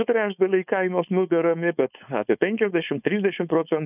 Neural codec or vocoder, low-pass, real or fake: codec, 16 kHz, 4.8 kbps, FACodec; 3.6 kHz; fake